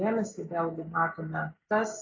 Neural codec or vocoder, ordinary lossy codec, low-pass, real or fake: none; AAC, 32 kbps; 7.2 kHz; real